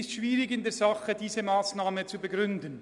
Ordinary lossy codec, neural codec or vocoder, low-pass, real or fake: MP3, 96 kbps; none; 10.8 kHz; real